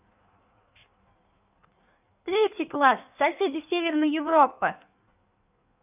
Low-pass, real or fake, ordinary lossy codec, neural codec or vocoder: 3.6 kHz; fake; none; codec, 16 kHz in and 24 kHz out, 1.1 kbps, FireRedTTS-2 codec